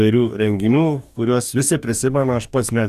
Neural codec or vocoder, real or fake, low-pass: codec, 44.1 kHz, 2.6 kbps, DAC; fake; 14.4 kHz